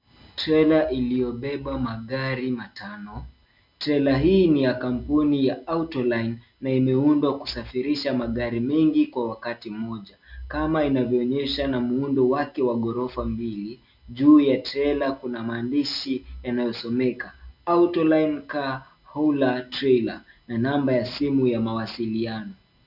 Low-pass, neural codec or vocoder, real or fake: 5.4 kHz; none; real